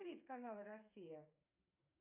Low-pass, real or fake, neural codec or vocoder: 3.6 kHz; fake; codec, 16 kHz, 4 kbps, FreqCodec, smaller model